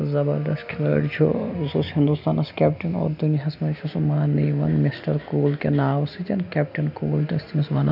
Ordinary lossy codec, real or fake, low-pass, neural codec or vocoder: none; real; 5.4 kHz; none